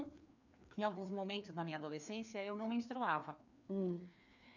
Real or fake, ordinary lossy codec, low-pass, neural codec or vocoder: fake; none; 7.2 kHz; codec, 16 kHz, 2 kbps, FreqCodec, larger model